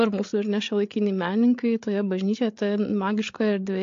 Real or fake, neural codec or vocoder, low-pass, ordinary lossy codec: fake; codec, 16 kHz, 8 kbps, FreqCodec, larger model; 7.2 kHz; AAC, 48 kbps